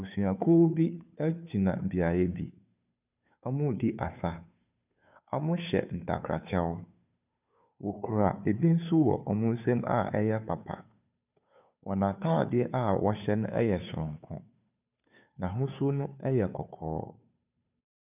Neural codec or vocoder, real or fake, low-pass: codec, 16 kHz, 2 kbps, FunCodec, trained on Chinese and English, 25 frames a second; fake; 3.6 kHz